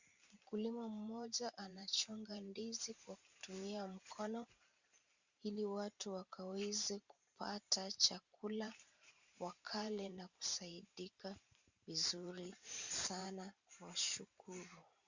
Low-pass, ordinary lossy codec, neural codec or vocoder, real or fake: 7.2 kHz; Opus, 64 kbps; vocoder, 44.1 kHz, 128 mel bands every 512 samples, BigVGAN v2; fake